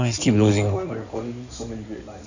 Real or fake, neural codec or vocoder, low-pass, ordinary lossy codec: fake; codec, 16 kHz in and 24 kHz out, 2.2 kbps, FireRedTTS-2 codec; 7.2 kHz; none